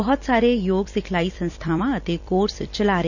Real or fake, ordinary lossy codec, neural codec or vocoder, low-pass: fake; none; vocoder, 44.1 kHz, 128 mel bands every 256 samples, BigVGAN v2; 7.2 kHz